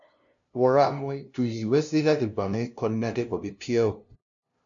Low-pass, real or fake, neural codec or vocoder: 7.2 kHz; fake; codec, 16 kHz, 0.5 kbps, FunCodec, trained on LibriTTS, 25 frames a second